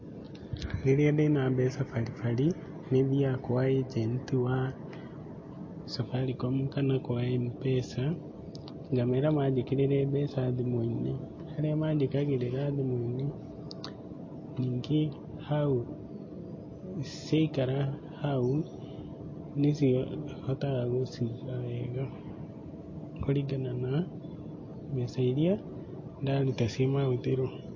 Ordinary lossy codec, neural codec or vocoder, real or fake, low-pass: MP3, 32 kbps; none; real; 7.2 kHz